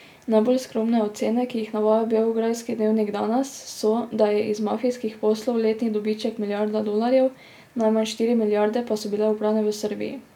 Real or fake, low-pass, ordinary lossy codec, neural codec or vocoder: real; 19.8 kHz; none; none